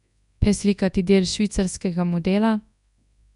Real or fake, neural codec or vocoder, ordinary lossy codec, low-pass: fake; codec, 24 kHz, 0.9 kbps, WavTokenizer, large speech release; none; 10.8 kHz